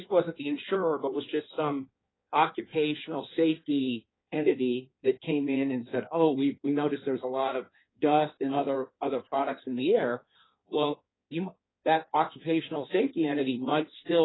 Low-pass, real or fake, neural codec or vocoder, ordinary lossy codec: 7.2 kHz; fake; codec, 16 kHz in and 24 kHz out, 1.1 kbps, FireRedTTS-2 codec; AAC, 16 kbps